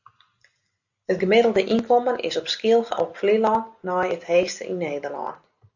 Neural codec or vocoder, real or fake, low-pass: none; real; 7.2 kHz